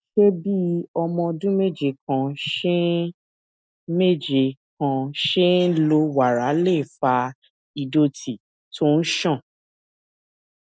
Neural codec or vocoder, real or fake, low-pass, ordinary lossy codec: none; real; none; none